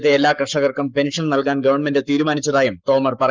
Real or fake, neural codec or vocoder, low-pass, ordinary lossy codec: fake; codec, 44.1 kHz, 7.8 kbps, Pupu-Codec; 7.2 kHz; Opus, 32 kbps